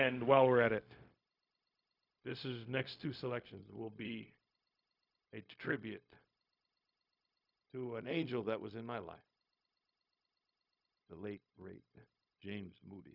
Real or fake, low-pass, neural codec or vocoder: fake; 5.4 kHz; codec, 16 kHz, 0.4 kbps, LongCat-Audio-Codec